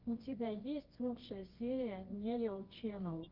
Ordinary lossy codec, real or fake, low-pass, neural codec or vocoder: Opus, 24 kbps; fake; 5.4 kHz; codec, 24 kHz, 0.9 kbps, WavTokenizer, medium music audio release